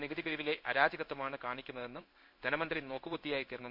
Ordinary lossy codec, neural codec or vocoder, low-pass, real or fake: MP3, 48 kbps; codec, 16 kHz in and 24 kHz out, 1 kbps, XY-Tokenizer; 5.4 kHz; fake